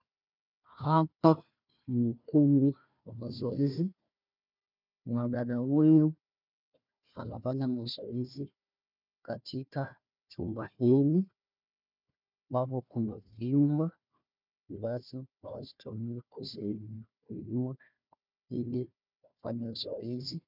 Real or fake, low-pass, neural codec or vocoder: fake; 5.4 kHz; codec, 16 kHz, 1 kbps, FunCodec, trained on Chinese and English, 50 frames a second